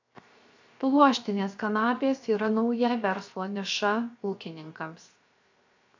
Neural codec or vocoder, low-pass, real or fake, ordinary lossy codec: codec, 16 kHz, 0.7 kbps, FocalCodec; 7.2 kHz; fake; AAC, 48 kbps